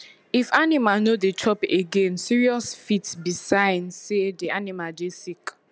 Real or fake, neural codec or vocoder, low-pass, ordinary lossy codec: real; none; none; none